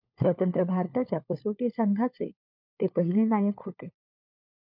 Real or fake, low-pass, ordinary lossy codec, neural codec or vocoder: fake; 5.4 kHz; MP3, 48 kbps; codec, 16 kHz, 4 kbps, FunCodec, trained on LibriTTS, 50 frames a second